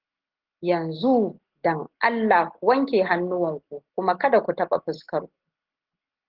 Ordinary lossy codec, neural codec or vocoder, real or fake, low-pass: Opus, 16 kbps; none; real; 5.4 kHz